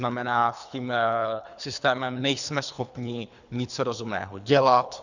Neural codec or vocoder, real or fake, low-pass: codec, 24 kHz, 3 kbps, HILCodec; fake; 7.2 kHz